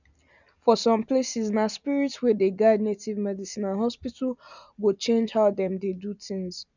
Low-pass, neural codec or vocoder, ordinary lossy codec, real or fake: 7.2 kHz; none; none; real